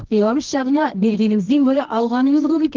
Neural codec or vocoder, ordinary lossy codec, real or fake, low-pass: codec, 24 kHz, 0.9 kbps, WavTokenizer, medium music audio release; Opus, 16 kbps; fake; 7.2 kHz